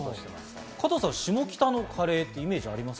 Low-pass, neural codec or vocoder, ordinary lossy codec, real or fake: none; none; none; real